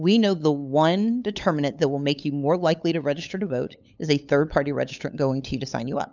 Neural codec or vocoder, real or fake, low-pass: codec, 16 kHz, 8 kbps, FunCodec, trained on LibriTTS, 25 frames a second; fake; 7.2 kHz